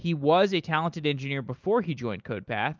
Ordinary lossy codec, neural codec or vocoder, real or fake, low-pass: Opus, 24 kbps; none; real; 7.2 kHz